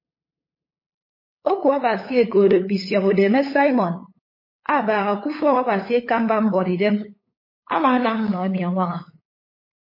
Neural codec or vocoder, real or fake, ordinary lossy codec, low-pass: codec, 16 kHz, 8 kbps, FunCodec, trained on LibriTTS, 25 frames a second; fake; MP3, 24 kbps; 5.4 kHz